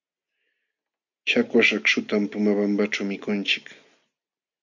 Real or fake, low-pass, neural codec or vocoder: real; 7.2 kHz; none